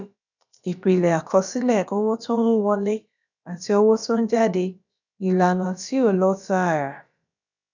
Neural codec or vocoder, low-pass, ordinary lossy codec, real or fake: codec, 16 kHz, about 1 kbps, DyCAST, with the encoder's durations; 7.2 kHz; none; fake